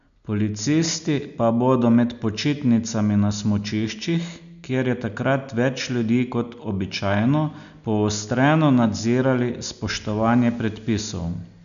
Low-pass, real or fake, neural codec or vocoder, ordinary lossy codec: 7.2 kHz; real; none; none